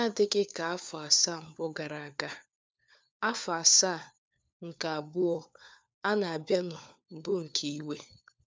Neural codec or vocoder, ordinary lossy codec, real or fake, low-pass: codec, 16 kHz, 4 kbps, FunCodec, trained on LibriTTS, 50 frames a second; none; fake; none